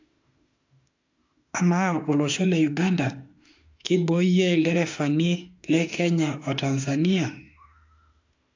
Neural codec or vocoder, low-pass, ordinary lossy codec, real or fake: autoencoder, 48 kHz, 32 numbers a frame, DAC-VAE, trained on Japanese speech; 7.2 kHz; AAC, 48 kbps; fake